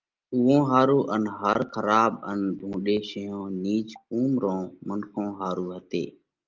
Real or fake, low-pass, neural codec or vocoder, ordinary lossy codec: real; 7.2 kHz; none; Opus, 32 kbps